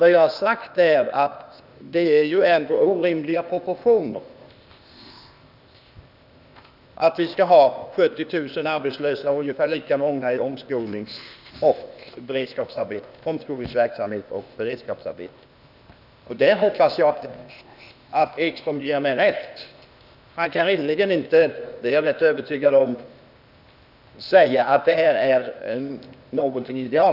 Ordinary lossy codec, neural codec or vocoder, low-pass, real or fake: none; codec, 16 kHz, 0.8 kbps, ZipCodec; 5.4 kHz; fake